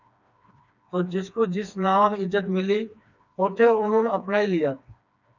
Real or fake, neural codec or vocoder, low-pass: fake; codec, 16 kHz, 2 kbps, FreqCodec, smaller model; 7.2 kHz